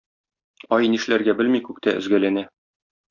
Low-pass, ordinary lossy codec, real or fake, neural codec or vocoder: 7.2 kHz; Opus, 64 kbps; real; none